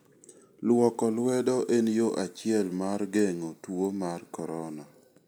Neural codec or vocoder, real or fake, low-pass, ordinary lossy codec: none; real; none; none